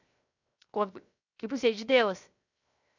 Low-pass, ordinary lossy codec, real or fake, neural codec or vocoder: 7.2 kHz; none; fake; codec, 16 kHz, 0.7 kbps, FocalCodec